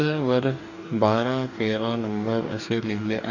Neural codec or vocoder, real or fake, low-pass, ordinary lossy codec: codec, 44.1 kHz, 2.6 kbps, DAC; fake; 7.2 kHz; none